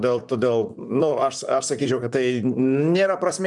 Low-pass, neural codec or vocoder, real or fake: 10.8 kHz; vocoder, 44.1 kHz, 128 mel bands, Pupu-Vocoder; fake